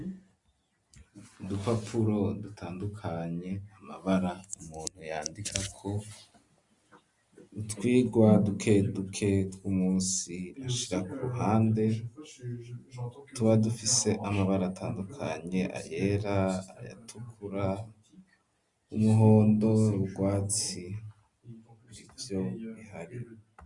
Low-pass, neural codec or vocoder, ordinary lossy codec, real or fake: 10.8 kHz; none; Opus, 64 kbps; real